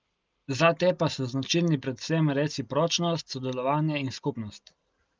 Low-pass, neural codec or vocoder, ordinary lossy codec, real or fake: 7.2 kHz; none; Opus, 24 kbps; real